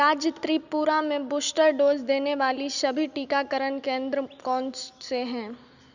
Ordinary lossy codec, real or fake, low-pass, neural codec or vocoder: none; real; 7.2 kHz; none